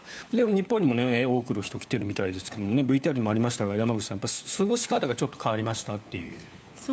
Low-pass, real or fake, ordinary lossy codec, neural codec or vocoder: none; fake; none; codec, 16 kHz, 4 kbps, FunCodec, trained on LibriTTS, 50 frames a second